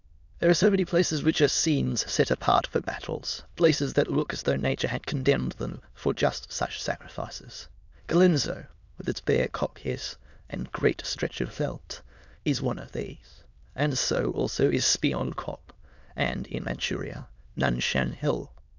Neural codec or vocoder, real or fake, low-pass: autoencoder, 22.05 kHz, a latent of 192 numbers a frame, VITS, trained on many speakers; fake; 7.2 kHz